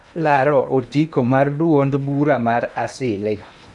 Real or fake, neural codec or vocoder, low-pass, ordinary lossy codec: fake; codec, 16 kHz in and 24 kHz out, 0.8 kbps, FocalCodec, streaming, 65536 codes; 10.8 kHz; none